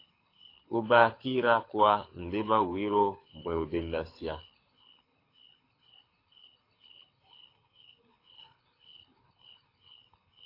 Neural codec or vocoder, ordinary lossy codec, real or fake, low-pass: codec, 24 kHz, 6 kbps, HILCodec; AAC, 32 kbps; fake; 5.4 kHz